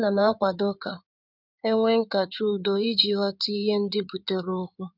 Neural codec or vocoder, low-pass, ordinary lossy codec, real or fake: codec, 16 kHz in and 24 kHz out, 2.2 kbps, FireRedTTS-2 codec; 5.4 kHz; none; fake